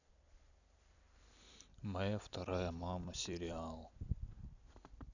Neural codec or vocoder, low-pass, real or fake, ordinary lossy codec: vocoder, 44.1 kHz, 128 mel bands every 256 samples, BigVGAN v2; 7.2 kHz; fake; none